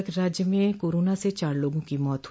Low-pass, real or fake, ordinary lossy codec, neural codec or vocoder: none; real; none; none